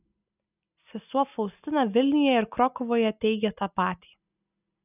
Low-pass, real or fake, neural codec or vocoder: 3.6 kHz; real; none